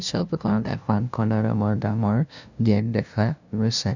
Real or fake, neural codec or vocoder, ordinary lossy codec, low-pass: fake; codec, 16 kHz, 0.5 kbps, FunCodec, trained on LibriTTS, 25 frames a second; none; 7.2 kHz